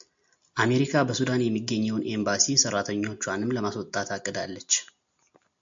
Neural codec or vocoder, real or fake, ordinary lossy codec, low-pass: none; real; MP3, 64 kbps; 7.2 kHz